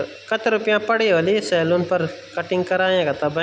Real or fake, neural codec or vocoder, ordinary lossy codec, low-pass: real; none; none; none